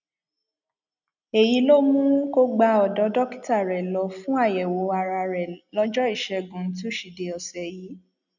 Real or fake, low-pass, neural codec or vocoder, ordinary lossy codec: real; 7.2 kHz; none; none